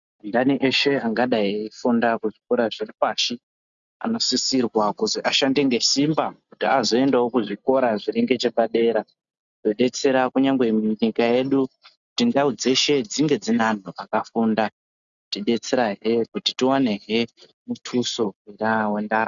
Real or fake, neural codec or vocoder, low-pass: real; none; 7.2 kHz